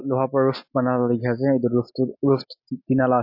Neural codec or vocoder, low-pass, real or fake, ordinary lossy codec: none; 5.4 kHz; real; MP3, 32 kbps